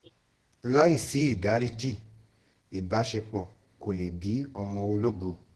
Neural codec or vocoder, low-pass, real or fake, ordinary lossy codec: codec, 24 kHz, 0.9 kbps, WavTokenizer, medium music audio release; 10.8 kHz; fake; Opus, 16 kbps